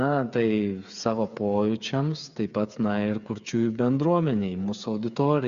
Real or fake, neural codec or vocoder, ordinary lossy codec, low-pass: fake; codec, 16 kHz, 8 kbps, FreqCodec, smaller model; Opus, 64 kbps; 7.2 kHz